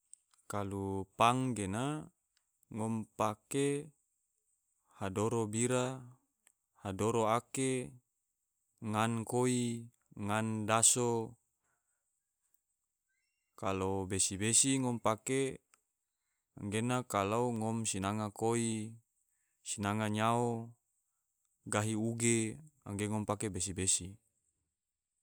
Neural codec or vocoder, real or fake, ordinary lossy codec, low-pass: none; real; none; none